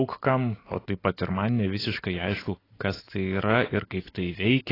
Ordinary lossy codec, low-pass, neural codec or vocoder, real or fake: AAC, 24 kbps; 5.4 kHz; none; real